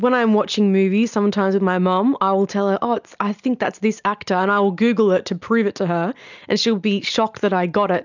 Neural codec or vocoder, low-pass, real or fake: none; 7.2 kHz; real